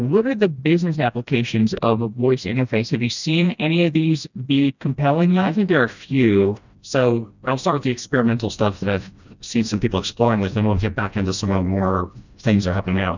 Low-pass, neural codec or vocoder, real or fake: 7.2 kHz; codec, 16 kHz, 1 kbps, FreqCodec, smaller model; fake